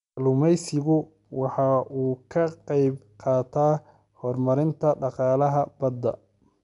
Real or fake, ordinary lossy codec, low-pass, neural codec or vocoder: real; none; 10.8 kHz; none